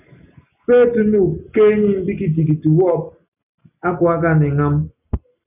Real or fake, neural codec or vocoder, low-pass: real; none; 3.6 kHz